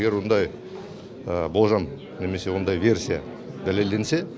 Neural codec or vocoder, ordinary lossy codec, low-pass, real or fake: none; none; none; real